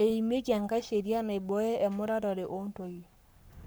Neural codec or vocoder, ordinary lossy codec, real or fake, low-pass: codec, 44.1 kHz, 7.8 kbps, Pupu-Codec; none; fake; none